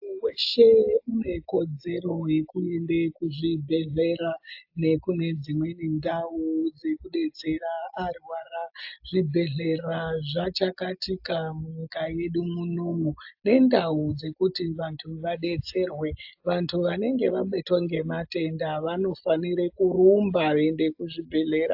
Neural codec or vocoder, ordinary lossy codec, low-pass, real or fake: none; AAC, 48 kbps; 5.4 kHz; real